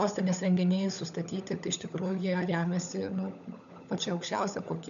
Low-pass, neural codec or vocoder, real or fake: 7.2 kHz; codec, 16 kHz, 16 kbps, FunCodec, trained on LibriTTS, 50 frames a second; fake